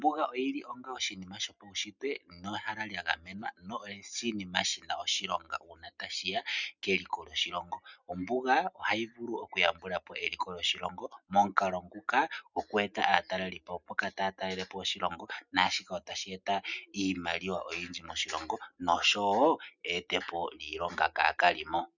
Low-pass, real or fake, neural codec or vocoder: 7.2 kHz; real; none